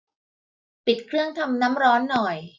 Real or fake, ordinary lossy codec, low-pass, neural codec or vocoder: real; none; none; none